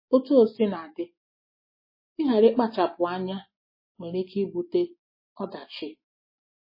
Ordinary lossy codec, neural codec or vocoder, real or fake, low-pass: MP3, 24 kbps; none; real; 5.4 kHz